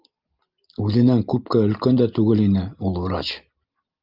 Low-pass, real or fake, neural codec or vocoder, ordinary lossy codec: 5.4 kHz; real; none; Opus, 24 kbps